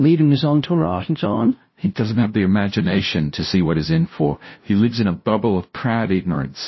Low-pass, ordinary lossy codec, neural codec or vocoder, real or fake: 7.2 kHz; MP3, 24 kbps; codec, 16 kHz, 0.5 kbps, FunCodec, trained on LibriTTS, 25 frames a second; fake